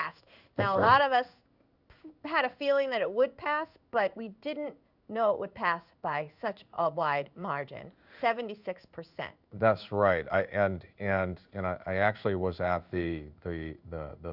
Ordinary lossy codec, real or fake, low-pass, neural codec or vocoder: Opus, 64 kbps; fake; 5.4 kHz; codec, 16 kHz in and 24 kHz out, 1 kbps, XY-Tokenizer